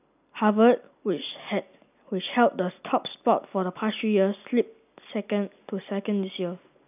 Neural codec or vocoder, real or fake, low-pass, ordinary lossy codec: none; real; 3.6 kHz; none